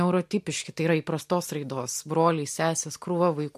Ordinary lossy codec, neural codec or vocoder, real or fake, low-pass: MP3, 64 kbps; none; real; 14.4 kHz